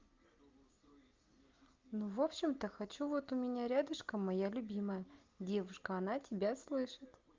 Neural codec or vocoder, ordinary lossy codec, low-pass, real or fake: none; Opus, 32 kbps; 7.2 kHz; real